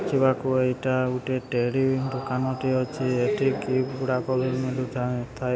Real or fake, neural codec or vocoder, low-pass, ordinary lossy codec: real; none; none; none